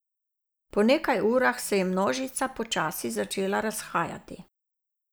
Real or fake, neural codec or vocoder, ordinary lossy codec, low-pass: real; none; none; none